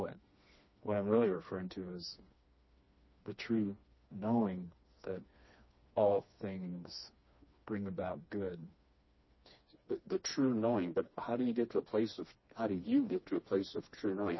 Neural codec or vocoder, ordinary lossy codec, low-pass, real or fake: codec, 16 kHz, 2 kbps, FreqCodec, smaller model; MP3, 24 kbps; 7.2 kHz; fake